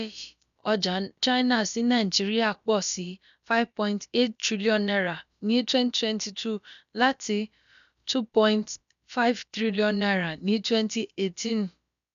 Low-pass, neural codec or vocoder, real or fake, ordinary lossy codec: 7.2 kHz; codec, 16 kHz, about 1 kbps, DyCAST, with the encoder's durations; fake; none